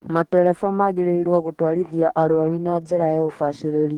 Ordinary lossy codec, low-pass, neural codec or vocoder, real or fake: Opus, 24 kbps; 19.8 kHz; codec, 44.1 kHz, 2.6 kbps, DAC; fake